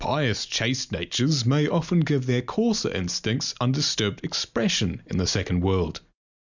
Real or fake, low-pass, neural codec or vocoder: real; 7.2 kHz; none